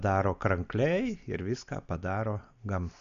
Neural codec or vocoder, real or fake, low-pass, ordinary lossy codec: none; real; 7.2 kHz; AAC, 96 kbps